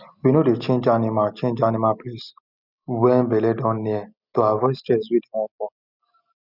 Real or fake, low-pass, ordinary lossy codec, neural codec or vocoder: real; 5.4 kHz; none; none